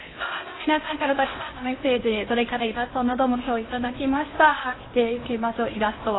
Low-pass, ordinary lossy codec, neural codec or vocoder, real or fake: 7.2 kHz; AAC, 16 kbps; codec, 16 kHz in and 24 kHz out, 0.8 kbps, FocalCodec, streaming, 65536 codes; fake